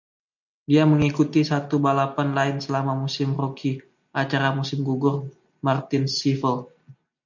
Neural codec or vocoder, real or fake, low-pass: none; real; 7.2 kHz